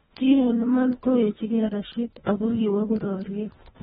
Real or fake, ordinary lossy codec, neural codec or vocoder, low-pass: fake; AAC, 16 kbps; codec, 24 kHz, 1.5 kbps, HILCodec; 10.8 kHz